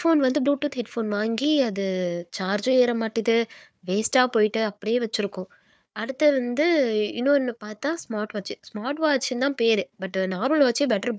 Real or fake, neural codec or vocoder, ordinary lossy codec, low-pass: fake; codec, 16 kHz, 4 kbps, FunCodec, trained on Chinese and English, 50 frames a second; none; none